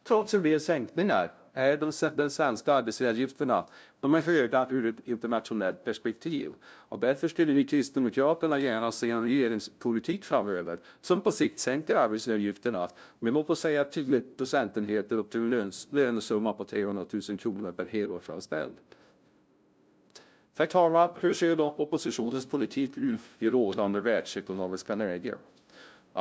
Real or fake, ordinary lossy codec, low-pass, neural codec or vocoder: fake; none; none; codec, 16 kHz, 0.5 kbps, FunCodec, trained on LibriTTS, 25 frames a second